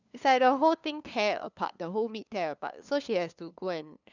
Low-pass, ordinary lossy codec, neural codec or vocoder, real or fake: 7.2 kHz; none; codec, 16 kHz, 2 kbps, FunCodec, trained on LibriTTS, 25 frames a second; fake